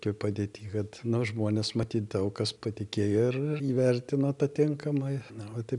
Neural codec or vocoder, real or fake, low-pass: none; real; 10.8 kHz